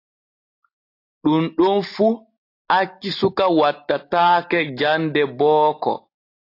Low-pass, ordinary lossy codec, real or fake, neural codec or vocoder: 5.4 kHz; AAC, 32 kbps; real; none